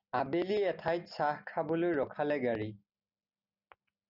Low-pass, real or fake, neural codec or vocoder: 5.4 kHz; real; none